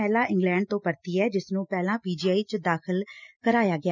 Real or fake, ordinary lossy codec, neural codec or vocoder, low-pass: real; none; none; 7.2 kHz